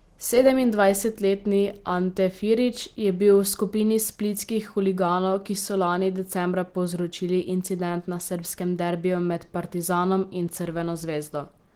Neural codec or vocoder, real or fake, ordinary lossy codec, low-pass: none; real; Opus, 16 kbps; 19.8 kHz